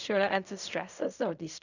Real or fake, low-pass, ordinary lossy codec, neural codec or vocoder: fake; 7.2 kHz; none; codec, 16 kHz in and 24 kHz out, 0.4 kbps, LongCat-Audio-Codec, fine tuned four codebook decoder